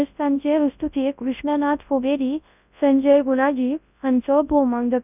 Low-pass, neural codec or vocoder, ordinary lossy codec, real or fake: 3.6 kHz; codec, 24 kHz, 0.9 kbps, WavTokenizer, large speech release; none; fake